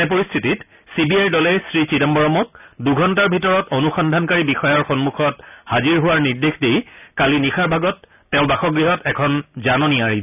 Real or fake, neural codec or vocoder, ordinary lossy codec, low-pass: real; none; none; 3.6 kHz